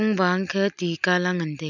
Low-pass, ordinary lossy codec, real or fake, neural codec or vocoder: 7.2 kHz; none; real; none